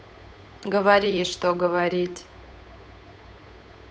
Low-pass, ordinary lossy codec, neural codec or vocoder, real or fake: none; none; codec, 16 kHz, 8 kbps, FunCodec, trained on Chinese and English, 25 frames a second; fake